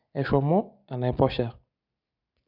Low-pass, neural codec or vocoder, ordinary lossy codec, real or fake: 5.4 kHz; none; none; real